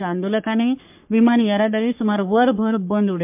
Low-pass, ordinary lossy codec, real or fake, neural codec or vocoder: 3.6 kHz; MP3, 32 kbps; fake; codec, 44.1 kHz, 3.4 kbps, Pupu-Codec